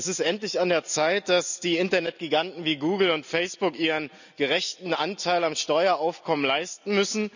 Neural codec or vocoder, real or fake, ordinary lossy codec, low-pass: none; real; none; 7.2 kHz